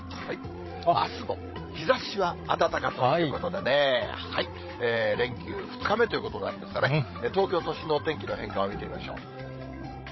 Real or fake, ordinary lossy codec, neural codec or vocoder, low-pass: fake; MP3, 24 kbps; codec, 16 kHz, 16 kbps, FreqCodec, larger model; 7.2 kHz